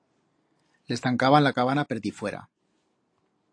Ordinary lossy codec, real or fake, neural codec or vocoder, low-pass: AAC, 48 kbps; real; none; 9.9 kHz